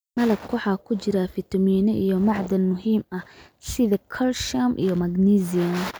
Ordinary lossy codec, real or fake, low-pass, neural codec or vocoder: none; real; none; none